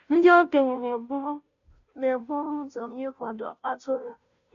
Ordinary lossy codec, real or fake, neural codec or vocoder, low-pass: none; fake; codec, 16 kHz, 0.5 kbps, FunCodec, trained on Chinese and English, 25 frames a second; 7.2 kHz